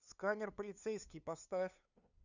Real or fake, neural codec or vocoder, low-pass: fake; codec, 16 kHz, 2 kbps, FunCodec, trained on LibriTTS, 25 frames a second; 7.2 kHz